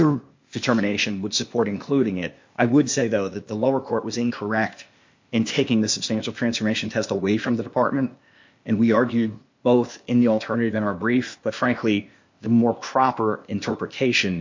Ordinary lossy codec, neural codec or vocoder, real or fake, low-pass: MP3, 48 kbps; codec, 16 kHz, 0.8 kbps, ZipCodec; fake; 7.2 kHz